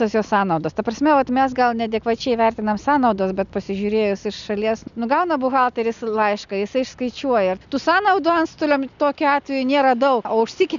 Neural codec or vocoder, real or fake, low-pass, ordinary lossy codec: none; real; 7.2 kHz; MP3, 96 kbps